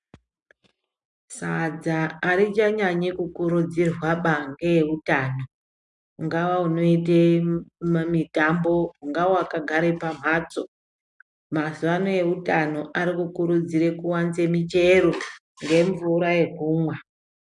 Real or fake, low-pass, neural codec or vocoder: real; 10.8 kHz; none